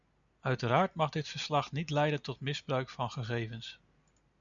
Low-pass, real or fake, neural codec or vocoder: 7.2 kHz; real; none